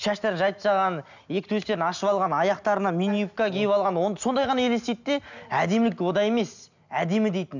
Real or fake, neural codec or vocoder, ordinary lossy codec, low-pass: real; none; none; 7.2 kHz